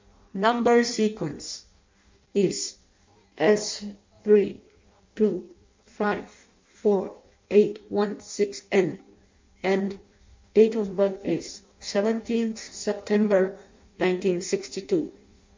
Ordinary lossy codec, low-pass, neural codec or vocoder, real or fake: MP3, 48 kbps; 7.2 kHz; codec, 16 kHz in and 24 kHz out, 0.6 kbps, FireRedTTS-2 codec; fake